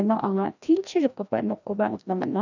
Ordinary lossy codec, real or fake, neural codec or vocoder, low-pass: none; fake; codec, 16 kHz, 1 kbps, FreqCodec, larger model; 7.2 kHz